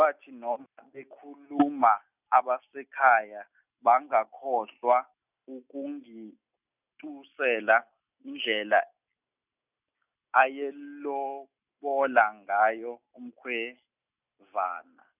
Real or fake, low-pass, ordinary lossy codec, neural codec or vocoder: real; 3.6 kHz; none; none